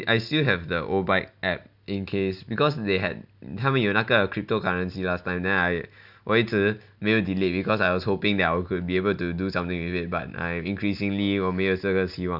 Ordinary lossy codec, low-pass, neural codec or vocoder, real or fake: none; 5.4 kHz; none; real